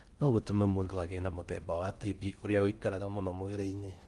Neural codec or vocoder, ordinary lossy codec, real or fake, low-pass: codec, 16 kHz in and 24 kHz out, 0.6 kbps, FocalCodec, streaming, 4096 codes; Opus, 32 kbps; fake; 10.8 kHz